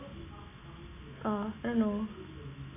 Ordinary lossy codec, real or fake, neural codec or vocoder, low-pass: none; real; none; 3.6 kHz